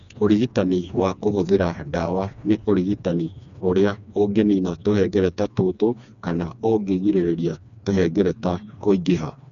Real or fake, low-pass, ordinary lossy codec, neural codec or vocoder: fake; 7.2 kHz; none; codec, 16 kHz, 2 kbps, FreqCodec, smaller model